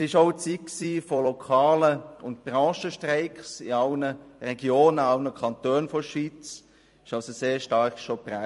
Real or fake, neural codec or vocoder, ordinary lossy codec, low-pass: fake; vocoder, 44.1 kHz, 128 mel bands every 256 samples, BigVGAN v2; MP3, 48 kbps; 14.4 kHz